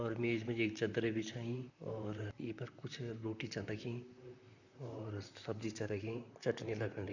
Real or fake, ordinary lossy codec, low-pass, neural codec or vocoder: fake; none; 7.2 kHz; vocoder, 44.1 kHz, 128 mel bands, Pupu-Vocoder